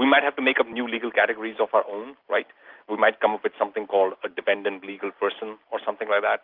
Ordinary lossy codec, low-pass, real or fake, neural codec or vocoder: Opus, 24 kbps; 5.4 kHz; real; none